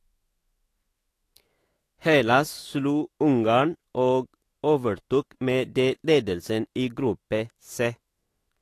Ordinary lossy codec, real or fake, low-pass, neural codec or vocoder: AAC, 48 kbps; fake; 14.4 kHz; autoencoder, 48 kHz, 128 numbers a frame, DAC-VAE, trained on Japanese speech